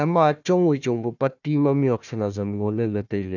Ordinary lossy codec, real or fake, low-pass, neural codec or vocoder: none; fake; 7.2 kHz; codec, 16 kHz, 1 kbps, FunCodec, trained on Chinese and English, 50 frames a second